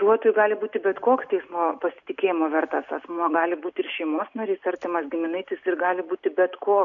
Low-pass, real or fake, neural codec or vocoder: 7.2 kHz; real; none